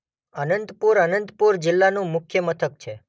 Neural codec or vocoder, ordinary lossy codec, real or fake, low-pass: none; none; real; none